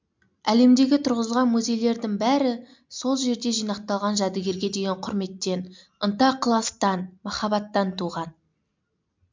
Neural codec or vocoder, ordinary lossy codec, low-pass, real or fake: none; MP3, 64 kbps; 7.2 kHz; real